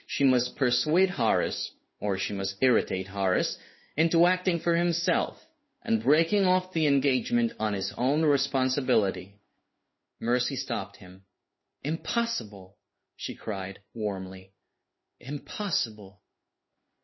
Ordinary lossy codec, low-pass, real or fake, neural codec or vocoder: MP3, 24 kbps; 7.2 kHz; fake; codec, 16 kHz in and 24 kHz out, 1 kbps, XY-Tokenizer